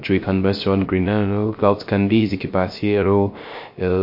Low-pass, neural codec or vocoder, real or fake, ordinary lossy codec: 5.4 kHz; codec, 16 kHz, 0.3 kbps, FocalCodec; fake; MP3, 32 kbps